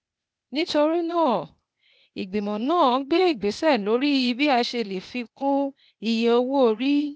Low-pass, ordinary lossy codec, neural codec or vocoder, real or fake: none; none; codec, 16 kHz, 0.8 kbps, ZipCodec; fake